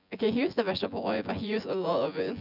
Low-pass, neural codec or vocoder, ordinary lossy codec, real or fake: 5.4 kHz; vocoder, 24 kHz, 100 mel bands, Vocos; none; fake